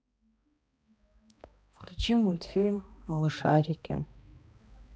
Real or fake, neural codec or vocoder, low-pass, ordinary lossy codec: fake; codec, 16 kHz, 1 kbps, X-Codec, HuBERT features, trained on balanced general audio; none; none